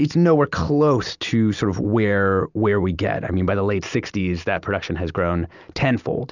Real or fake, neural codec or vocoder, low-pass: real; none; 7.2 kHz